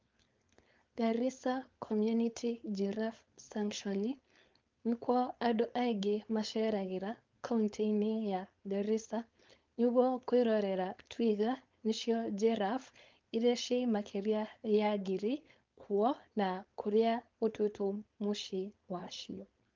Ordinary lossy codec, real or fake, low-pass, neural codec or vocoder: Opus, 32 kbps; fake; 7.2 kHz; codec, 16 kHz, 4.8 kbps, FACodec